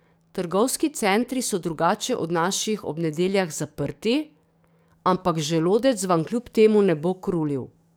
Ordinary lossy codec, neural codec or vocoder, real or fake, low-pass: none; codec, 44.1 kHz, 7.8 kbps, DAC; fake; none